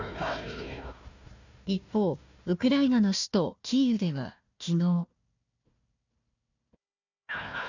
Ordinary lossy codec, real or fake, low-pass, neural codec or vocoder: none; fake; 7.2 kHz; codec, 16 kHz, 1 kbps, FunCodec, trained on Chinese and English, 50 frames a second